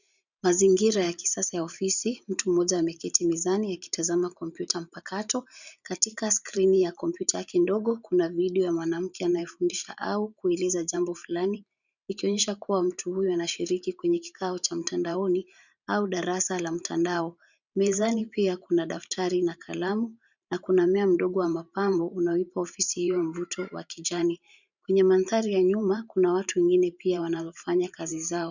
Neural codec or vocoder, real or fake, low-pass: vocoder, 44.1 kHz, 128 mel bands every 512 samples, BigVGAN v2; fake; 7.2 kHz